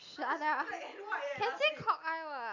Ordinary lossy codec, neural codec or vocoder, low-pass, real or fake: none; none; 7.2 kHz; real